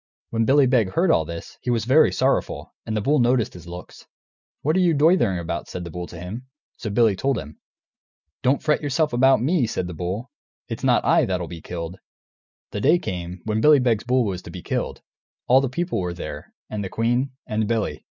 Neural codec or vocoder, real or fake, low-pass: none; real; 7.2 kHz